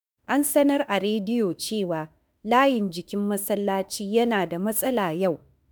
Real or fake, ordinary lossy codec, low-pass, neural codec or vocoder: fake; none; none; autoencoder, 48 kHz, 32 numbers a frame, DAC-VAE, trained on Japanese speech